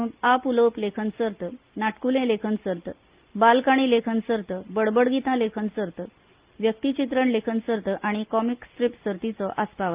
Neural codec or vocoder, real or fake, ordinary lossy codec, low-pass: none; real; Opus, 32 kbps; 3.6 kHz